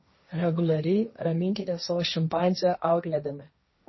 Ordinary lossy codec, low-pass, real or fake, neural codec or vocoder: MP3, 24 kbps; 7.2 kHz; fake; codec, 16 kHz, 1.1 kbps, Voila-Tokenizer